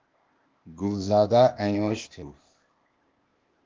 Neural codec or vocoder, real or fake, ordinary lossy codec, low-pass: codec, 16 kHz, 0.8 kbps, ZipCodec; fake; Opus, 32 kbps; 7.2 kHz